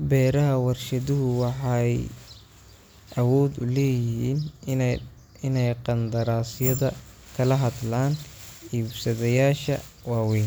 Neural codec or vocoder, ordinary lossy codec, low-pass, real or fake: none; none; none; real